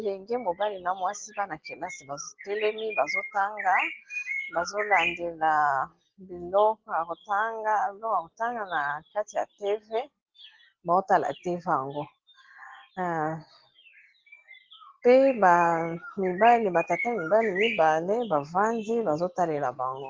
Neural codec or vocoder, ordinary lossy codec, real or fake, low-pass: none; Opus, 16 kbps; real; 7.2 kHz